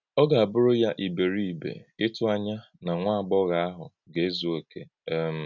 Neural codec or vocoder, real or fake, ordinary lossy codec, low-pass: none; real; none; 7.2 kHz